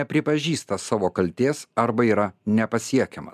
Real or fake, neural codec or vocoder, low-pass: real; none; 14.4 kHz